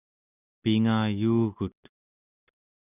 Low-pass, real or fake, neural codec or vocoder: 3.6 kHz; real; none